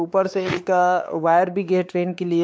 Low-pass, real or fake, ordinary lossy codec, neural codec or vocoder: none; fake; none; codec, 16 kHz, 4 kbps, X-Codec, WavLM features, trained on Multilingual LibriSpeech